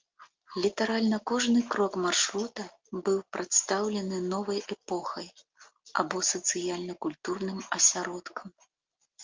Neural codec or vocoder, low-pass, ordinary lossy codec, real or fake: none; 7.2 kHz; Opus, 24 kbps; real